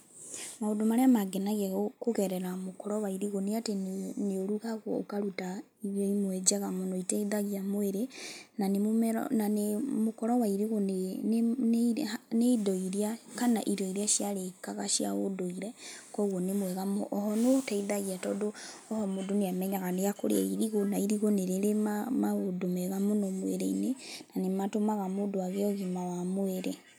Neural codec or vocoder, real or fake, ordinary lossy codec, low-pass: none; real; none; none